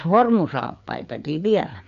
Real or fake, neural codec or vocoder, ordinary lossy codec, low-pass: fake; codec, 16 kHz, 4 kbps, FreqCodec, larger model; AAC, 64 kbps; 7.2 kHz